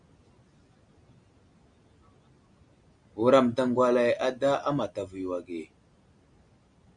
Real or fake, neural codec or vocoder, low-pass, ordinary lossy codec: real; none; 9.9 kHz; Opus, 64 kbps